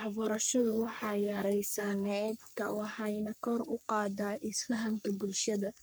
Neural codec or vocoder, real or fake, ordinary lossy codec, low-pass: codec, 44.1 kHz, 3.4 kbps, Pupu-Codec; fake; none; none